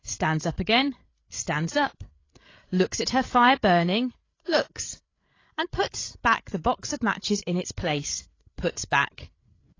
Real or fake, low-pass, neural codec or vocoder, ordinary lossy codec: fake; 7.2 kHz; codec, 16 kHz, 8 kbps, FreqCodec, larger model; AAC, 32 kbps